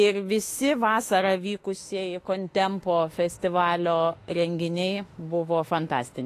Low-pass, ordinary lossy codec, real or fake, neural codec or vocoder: 14.4 kHz; AAC, 48 kbps; fake; autoencoder, 48 kHz, 32 numbers a frame, DAC-VAE, trained on Japanese speech